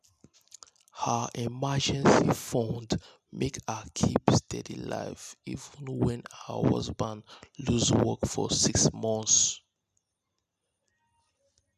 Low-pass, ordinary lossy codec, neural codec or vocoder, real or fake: 14.4 kHz; none; none; real